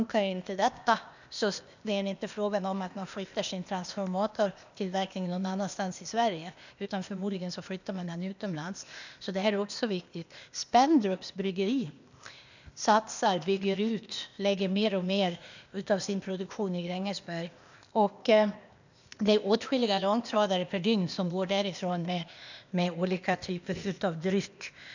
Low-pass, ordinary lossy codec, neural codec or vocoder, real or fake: 7.2 kHz; none; codec, 16 kHz, 0.8 kbps, ZipCodec; fake